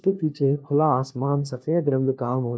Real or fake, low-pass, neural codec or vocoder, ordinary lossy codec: fake; none; codec, 16 kHz, 1 kbps, FunCodec, trained on LibriTTS, 50 frames a second; none